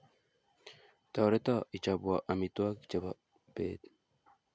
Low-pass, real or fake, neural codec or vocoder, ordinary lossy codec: none; real; none; none